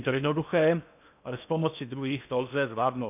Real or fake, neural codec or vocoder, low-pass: fake; codec, 16 kHz in and 24 kHz out, 0.8 kbps, FocalCodec, streaming, 65536 codes; 3.6 kHz